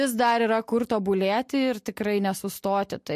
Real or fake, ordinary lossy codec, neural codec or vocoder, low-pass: real; MP3, 64 kbps; none; 14.4 kHz